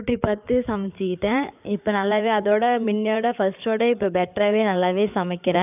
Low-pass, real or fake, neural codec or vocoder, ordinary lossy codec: 3.6 kHz; fake; codec, 16 kHz in and 24 kHz out, 2.2 kbps, FireRedTTS-2 codec; none